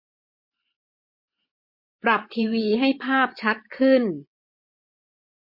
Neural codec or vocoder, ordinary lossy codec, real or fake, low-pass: vocoder, 24 kHz, 100 mel bands, Vocos; MP3, 32 kbps; fake; 5.4 kHz